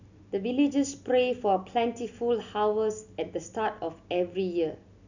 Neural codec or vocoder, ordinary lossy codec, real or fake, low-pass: none; none; real; 7.2 kHz